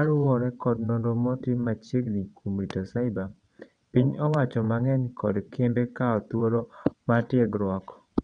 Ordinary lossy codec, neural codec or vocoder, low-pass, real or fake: none; vocoder, 22.05 kHz, 80 mel bands, WaveNeXt; 9.9 kHz; fake